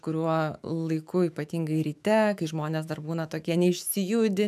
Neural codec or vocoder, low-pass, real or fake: autoencoder, 48 kHz, 128 numbers a frame, DAC-VAE, trained on Japanese speech; 14.4 kHz; fake